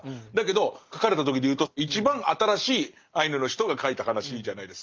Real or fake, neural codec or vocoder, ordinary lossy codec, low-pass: real; none; Opus, 24 kbps; 7.2 kHz